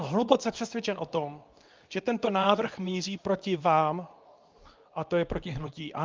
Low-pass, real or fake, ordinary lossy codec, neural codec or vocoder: 7.2 kHz; fake; Opus, 24 kbps; codec, 24 kHz, 0.9 kbps, WavTokenizer, medium speech release version 2